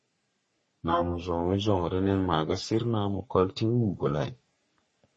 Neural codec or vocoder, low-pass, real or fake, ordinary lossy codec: codec, 44.1 kHz, 3.4 kbps, Pupu-Codec; 10.8 kHz; fake; MP3, 32 kbps